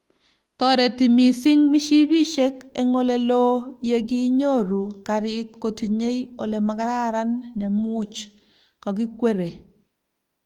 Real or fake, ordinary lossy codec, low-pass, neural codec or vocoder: fake; Opus, 24 kbps; 19.8 kHz; autoencoder, 48 kHz, 32 numbers a frame, DAC-VAE, trained on Japanese speech